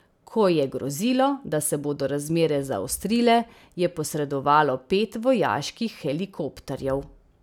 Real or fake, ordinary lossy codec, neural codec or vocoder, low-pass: real; none; none; 19.8 kHz